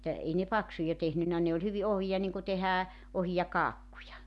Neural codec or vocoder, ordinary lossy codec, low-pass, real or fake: none; none; none; real